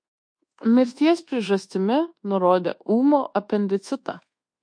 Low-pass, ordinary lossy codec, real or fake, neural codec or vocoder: 9.9 kHz; MP3, 48 kbps; fake; codec, 24 kHz, 1.2 kbps, DualCodec